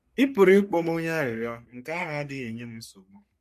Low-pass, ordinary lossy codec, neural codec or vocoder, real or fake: 14.4 kHz; MP3, 64 kbps; codec, 44.1 kHz, 3.4 kbps, Pupu-Codec; fake